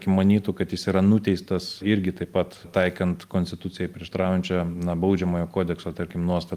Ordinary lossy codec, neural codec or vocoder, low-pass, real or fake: Opus, 32 kbps; none; 14.4 kHz; real